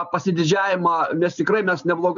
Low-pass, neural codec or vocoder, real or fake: 7.2 kHz; none; real